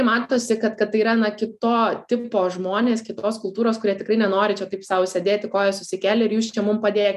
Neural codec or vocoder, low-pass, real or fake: none; 14.4 kHz; real